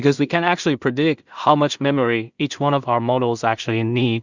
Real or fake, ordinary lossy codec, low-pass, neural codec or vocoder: fake; Opus, 64 kbps; 7.2 kHz; codec, 16 kHz in and 24 kHz out, 0.4 kbps, LongCat-Audio-Codec, two codebook decoder